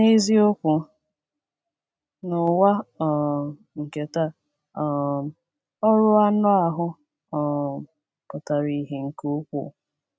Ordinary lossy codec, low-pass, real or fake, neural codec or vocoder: none; none; real; none